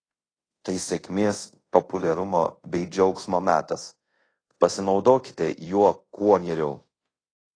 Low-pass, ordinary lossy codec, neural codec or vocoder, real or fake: 9.9 kHz; AAC, 32 kbps; codec, 24 kHz, 0.5 kbps, DualCodec; fake